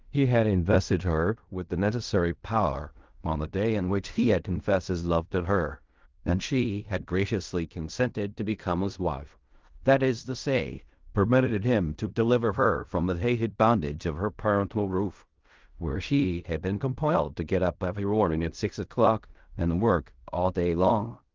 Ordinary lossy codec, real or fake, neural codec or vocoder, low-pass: Opus, 24 kbps; fake; codec, 16 kHz in and 24 kHz out, 0.4 kbps, LongCat-Audio-Codec, fine tuned four codebook decoder; 7.2 kHz